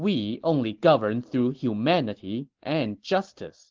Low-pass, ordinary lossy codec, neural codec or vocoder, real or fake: 7.2 kHz; Opus, 16 kbps; none; real